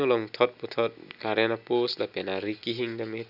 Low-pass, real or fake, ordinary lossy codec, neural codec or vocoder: 5.4 kHz; real; none; none